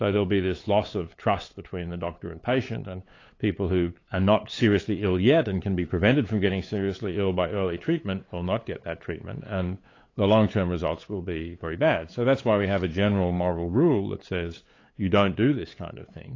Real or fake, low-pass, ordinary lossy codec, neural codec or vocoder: fake; 7.2 kHz; AAC, 32 kbps; codec, 16 kHz, 8 kbps, FunCodec, trained on LibriTTS, 25 frames a second